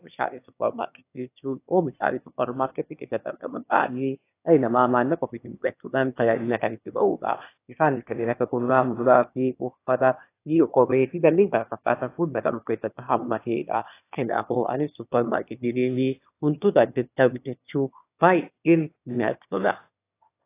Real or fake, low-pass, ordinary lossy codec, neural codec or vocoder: fake; 3.6 kHz; AAC, 24 kbps; autoencoder, 22.05 kHz, a latent of 192 numbers a frame, VITS, trained on one speaker